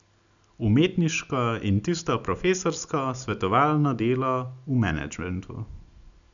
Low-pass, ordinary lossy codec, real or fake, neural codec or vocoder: 7.2 kHz; none; real; none